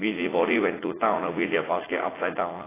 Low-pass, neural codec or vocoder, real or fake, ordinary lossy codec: 3.6 kHz; vocoder, 44.1 kHz, 80 mel bands, Vocos; fake; AAC, 16 kbps